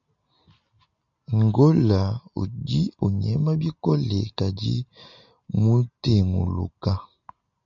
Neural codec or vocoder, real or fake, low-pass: none; real; 7.2 kHz